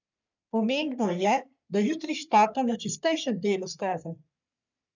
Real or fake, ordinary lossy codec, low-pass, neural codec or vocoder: fake; none; 7.2 kHz; codec, 44.1 kHz, 3.4 kbps, Pupu-Codec